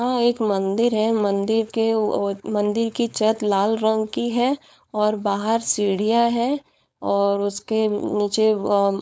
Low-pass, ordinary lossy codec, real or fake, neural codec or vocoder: none; none; fake; codec, 16 kHz, 4.8 kbps, FACodec